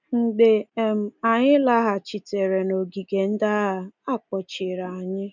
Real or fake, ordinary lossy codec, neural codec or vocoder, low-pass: real; none; none; 7.2 kHz